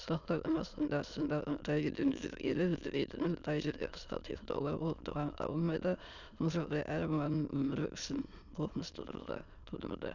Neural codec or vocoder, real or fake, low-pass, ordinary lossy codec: autoencoder, 22.05 kHz, a latent of 192 numbers a frame, VITS, trained on many speakers; fake; 7.2 kHz; none